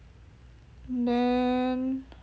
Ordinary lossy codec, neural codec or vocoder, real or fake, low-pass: none; none; real; none